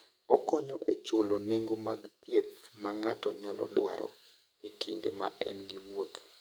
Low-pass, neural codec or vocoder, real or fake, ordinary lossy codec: none; codec, 44.1 kHz, 2.6 kbps, SNAC; fake; none